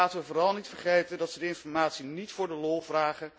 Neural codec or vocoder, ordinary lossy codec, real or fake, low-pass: none; none; real; none